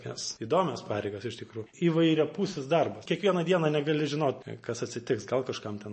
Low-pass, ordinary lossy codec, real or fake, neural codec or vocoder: 10.8 kHz; MP3, 32 kbps; real; none